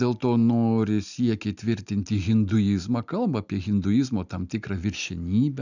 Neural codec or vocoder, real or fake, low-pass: none; real; 7.2 kHz